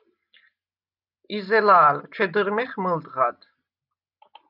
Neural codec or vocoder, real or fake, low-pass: none; real; 5.4 kHz